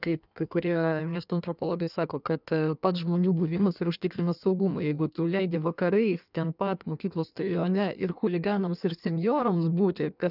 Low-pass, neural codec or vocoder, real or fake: 5.4 kHz; codec, 16 kHz in and 24 kHz out, 1.1 kbps, FireRedTTS-2 codec; fake